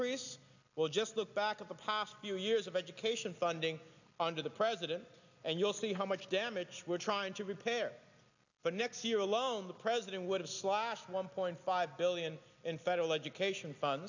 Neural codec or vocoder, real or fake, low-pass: none; real; 7.2 kHz